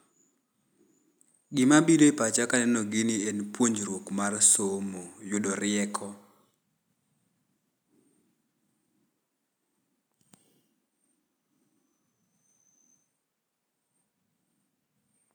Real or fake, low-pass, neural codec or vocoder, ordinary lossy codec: real; none; none; none